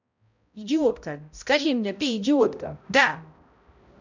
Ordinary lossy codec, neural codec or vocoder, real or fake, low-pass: none; codec, 16 kHz, 0.5 kbps, X-Codec, HuBERT features, trained on balanced general audio; fake; 7.2 kHz